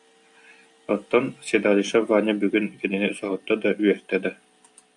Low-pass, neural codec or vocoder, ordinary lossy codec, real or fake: 10.8 kHz; none; AAC, 64 kbps; real